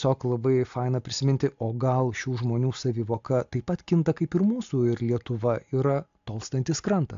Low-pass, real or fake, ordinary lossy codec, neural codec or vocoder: 7.2 kHz; real; AAC, 64 kbps; none